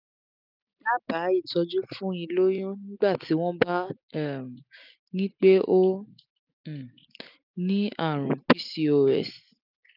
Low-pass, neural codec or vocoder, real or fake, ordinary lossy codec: 5.4 kHz; none; real; none